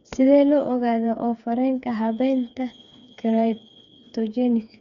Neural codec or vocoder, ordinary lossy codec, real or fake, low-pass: codec, 16 kHz, 4 kbps, FreqCodec, smaller model; none; fake; 7.2 kHz